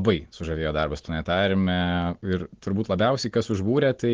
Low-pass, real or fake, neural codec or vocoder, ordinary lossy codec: 7.2 kHz; real; none; Opus, 16 kbps